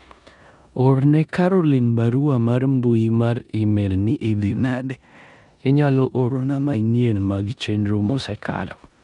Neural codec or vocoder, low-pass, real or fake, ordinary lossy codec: codec, 16 kHz in and 24 kHz out, 0.9 kbps, LongCat-Audio-Codec, four codebook decoder; 10.8 kHz; fake; none